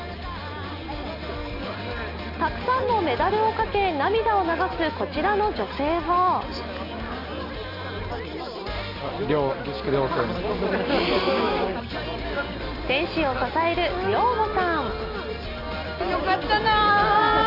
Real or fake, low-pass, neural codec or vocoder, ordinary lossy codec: real; 5.4 kHz; none; none